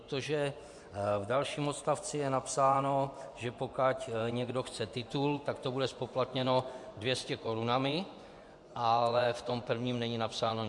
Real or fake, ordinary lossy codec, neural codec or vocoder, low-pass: fake; MP3, 64 kbps; vocoder, 24 kHz, 100 mel bands, Vocos; 10.8 kHz